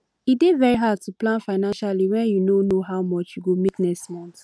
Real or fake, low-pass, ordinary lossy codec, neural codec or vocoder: real; none; none; none